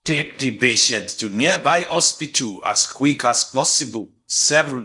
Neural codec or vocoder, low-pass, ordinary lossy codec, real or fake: codec, 16 kHz in and 24 kHz out, 0.6 kbps, FocalCodec, streaming, 4096 codes; 10.8 kHz; none; fake